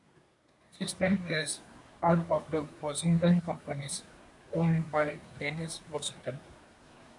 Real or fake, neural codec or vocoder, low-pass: fake; codec, 24 kHz, 1 kbps, SNAC; 10.8 kHz